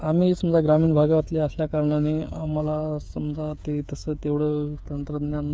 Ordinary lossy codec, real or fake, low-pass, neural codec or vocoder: none; fake; none; codec, 16 kHz, 8 kbps, FreqCodec, smaller model